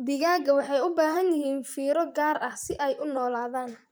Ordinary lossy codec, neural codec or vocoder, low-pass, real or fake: none; vocoder, 44.1 kHz, 128 mel bands, Pupu-Vocoder; none; fake